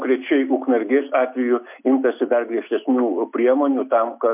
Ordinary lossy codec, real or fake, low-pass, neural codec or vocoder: MP3, 32 kbps; real; 3.6 kHz; none